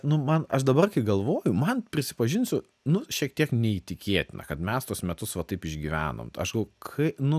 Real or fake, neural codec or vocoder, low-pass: real; none; 14.4 kHz